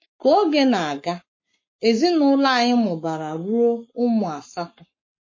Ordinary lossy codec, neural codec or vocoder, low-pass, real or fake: MP3, 32 kbps; codec, 44.1 kHz, 7.8 kbps, Pupu-Codec; 7.2 kHz; fake